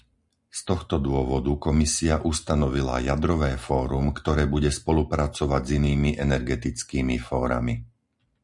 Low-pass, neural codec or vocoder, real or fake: 10.8 kHz; none; real